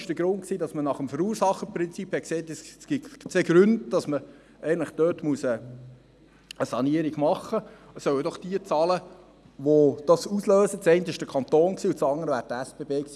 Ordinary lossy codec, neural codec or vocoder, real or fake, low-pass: none; none; real; none